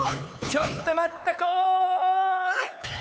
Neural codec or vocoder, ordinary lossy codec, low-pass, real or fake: codec, 16 kHz, 4 kbps, X-Codec, HuBERT features, trained on LibriSpeech; none; none; fake